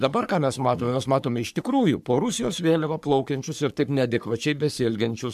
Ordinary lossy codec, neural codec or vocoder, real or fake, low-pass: MP3, 96 kbps; codec, 44.1 kHz, 3.4 kbps, Pupu-Codec; fake; 14.4 kHz